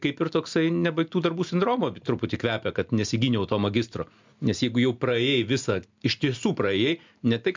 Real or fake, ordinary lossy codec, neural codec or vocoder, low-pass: real; MP3, 64 kbps; none; 7.2 kHz